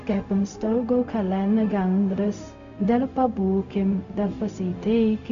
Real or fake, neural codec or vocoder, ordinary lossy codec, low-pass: fake; codec, 16 kHz, 0.4 kbps, LongCat-Audio-Codec; AAC, 64 kbps; 7.2 kHz